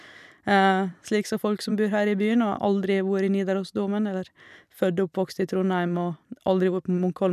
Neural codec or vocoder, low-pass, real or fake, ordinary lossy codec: vocoder, 44.1 kHz, 128 mel bands every 256 samples, BigVGAN v2; 14.4 kHz; fake; none